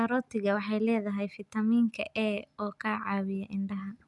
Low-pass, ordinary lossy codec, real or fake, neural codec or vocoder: 10.8 kHz; none; real; none